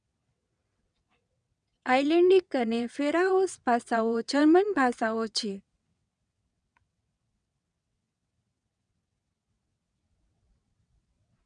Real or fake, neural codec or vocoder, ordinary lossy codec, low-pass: fake; vocoder, 22.05 kHz, 80 mel bands, WaveNeXt; none; 9.9 kHz